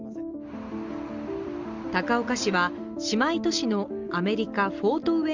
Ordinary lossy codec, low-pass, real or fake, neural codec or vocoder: Opus, 32 kbps; 7.2 kHz; real; none